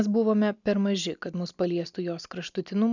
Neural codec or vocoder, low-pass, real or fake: none; 7.2 kHz; real